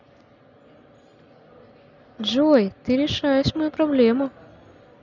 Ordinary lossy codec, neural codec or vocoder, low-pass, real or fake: none; none; 7.2 kHz; real